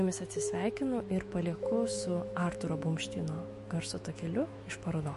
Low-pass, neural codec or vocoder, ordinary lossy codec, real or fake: 14.4 kHz; autoencoder, 48 kHz, 128 numbers a frame, DAC-VAE, trained on Japanese speech; MP3, 48 kbps; fake